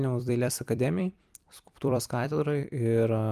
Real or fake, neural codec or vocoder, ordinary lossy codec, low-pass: fake; vocoder, 44.1 kHz, 128 mel bands every 256 samples, BigVGAN v2; Opus, 32 kbps; 14.4 kHz